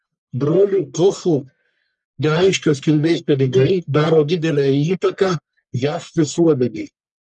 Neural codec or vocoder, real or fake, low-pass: codec, 44.1 kHz, 1.7 kbps, Pupu-Codec; fake; 10.8 kHz